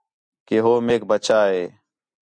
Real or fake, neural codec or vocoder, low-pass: real; none; 9.9 kHz